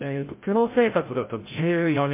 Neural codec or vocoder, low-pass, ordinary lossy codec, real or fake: codec, 16 kHz, 0.5 kbps, FreqCodec, larger model; 3.6 kHz; MP3, 24 kbps; fake